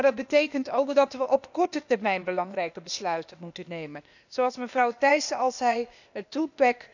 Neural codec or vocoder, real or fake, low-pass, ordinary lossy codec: codec, 16 kHz, 0.8 kbps, ZipCodec; fake; 7.2 kHz; none